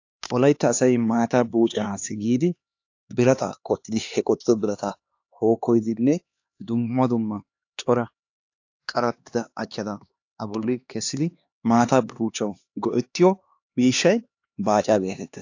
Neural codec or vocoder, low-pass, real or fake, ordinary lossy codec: codec, 16 kHz, 2 kbps, X-Codec, HuBERT features, trained on LibriSpeech; 7.2 kHz; fake; AAC, 48 kbps